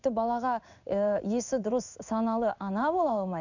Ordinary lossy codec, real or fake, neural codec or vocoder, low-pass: none; fake; codec, 16 kHz in and 24 kHz out, 1 kbps, XY-Tokenizer; 7.2 kHz